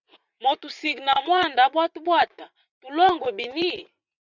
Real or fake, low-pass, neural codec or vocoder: fake; 7.2 kHz; vocoder, 44.1 kHz, 80 mel bands, Vocos